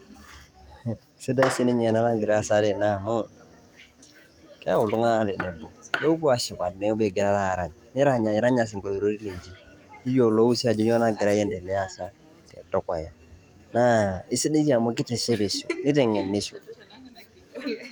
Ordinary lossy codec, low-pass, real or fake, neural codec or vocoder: none; 19.8 kHz; fake; codec, 44.1 kHz, 7.8 kbps, DAC